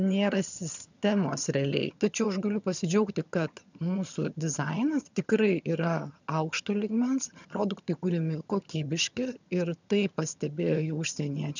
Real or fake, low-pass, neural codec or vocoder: fake; 7.2 kHz; vocoder, 22.05 kHz, 80 mel bands, HiFi-GAN